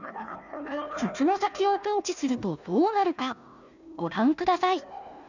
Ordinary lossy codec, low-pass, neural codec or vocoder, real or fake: none; 7.2 kHz; codec, 16 kHz, 1 kbps, FunCodec, trained on Chinese and English, 50 frames a second; fake